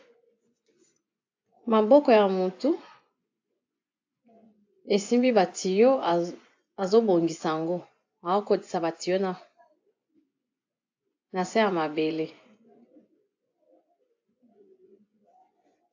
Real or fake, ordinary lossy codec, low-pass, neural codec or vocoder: fake; AAC, 48 kbps; 7.2 kHz; vocoder, 24 kHz, 100 mel bands, Vocos